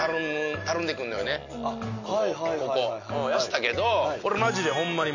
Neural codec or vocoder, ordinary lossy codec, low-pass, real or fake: none; none; 7.2 kHz; real